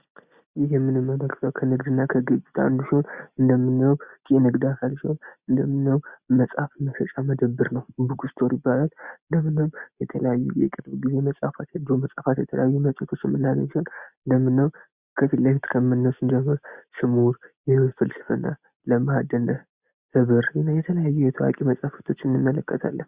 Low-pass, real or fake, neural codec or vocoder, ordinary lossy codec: 3.6 kHz; real; none; Opus, 64 kbps